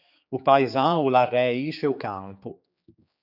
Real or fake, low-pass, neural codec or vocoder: fake; 5.4 kHz; codec, 16 kHz, 4 kbps, X-Codec, HuBERT features, trained on general audio